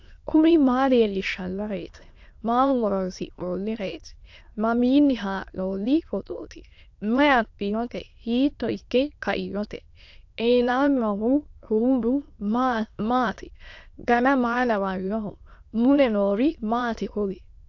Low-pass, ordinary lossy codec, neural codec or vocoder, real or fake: 7.2 kHz; AAC, 48 kbps; autoencoder, 22.05 kHz, a latent of 192 numbers a frame, VITS, trained on many speakers; fake